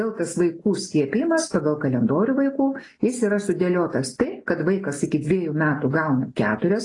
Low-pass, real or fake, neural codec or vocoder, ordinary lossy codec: 10.8 kHz; real; none; AAC, 32 kbps